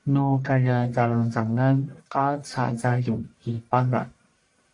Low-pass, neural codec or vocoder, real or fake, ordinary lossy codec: 10.8 kHz; codec, 44.1 kHz, 1.7 kbps, Pupu-Codec; fake; AAC, 64 kbps